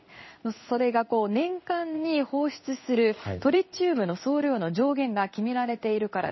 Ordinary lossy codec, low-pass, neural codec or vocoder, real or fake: MP3, 24 kbps; 7.2 kHz; codec, 16 kHz in and 24 kHz out, 1 kbps, XY-Tokenizer; fake